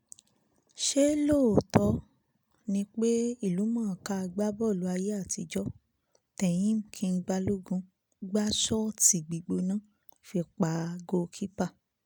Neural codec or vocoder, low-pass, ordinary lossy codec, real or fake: none; none; none; real